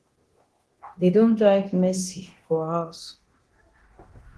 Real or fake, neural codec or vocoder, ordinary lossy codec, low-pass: fake; codec, 24 kHz, 0.9 kbps, DualCodec; Opus, 16 kbps; 10.8 kHz